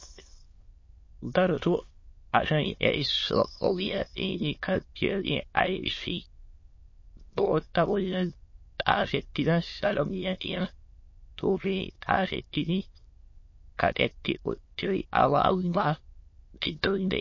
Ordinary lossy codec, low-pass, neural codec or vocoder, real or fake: MP3, 32 kbps; 7.2 kHz; autoencoder, 22.05 kHz, a latent of 192 numbers a frame, VITS, trained on many speakers; fake